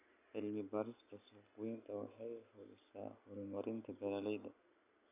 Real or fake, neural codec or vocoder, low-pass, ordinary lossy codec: real; none; 3.6 kHz; none